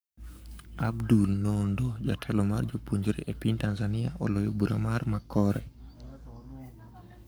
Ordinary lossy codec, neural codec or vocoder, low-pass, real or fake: none; codec, 44.1 kHz, 7.8 kbps, Pupu-Codec; none; fake